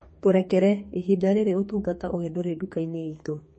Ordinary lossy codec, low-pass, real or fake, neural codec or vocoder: MP3, 32 kbps; 10.8 kHz; fake; codec, 32 kHz, 1.9 kbps, SNAC